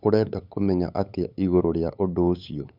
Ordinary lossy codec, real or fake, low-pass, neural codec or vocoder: none; fake; 5.4 kHz; codec, 16 kHz, 8 kbps, FunCodec, trained on LibriTTS, 25 frames a second